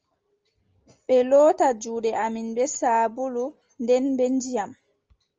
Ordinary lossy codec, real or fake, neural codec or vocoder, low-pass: Opus, 24 kbps; real; none; 7.2 kHz